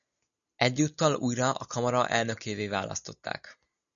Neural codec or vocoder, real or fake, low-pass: none; real; 7.2 kHz